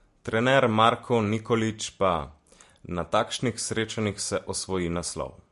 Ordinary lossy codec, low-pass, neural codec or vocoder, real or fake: MP3, 48 kbps; 14.4 kHz; none; real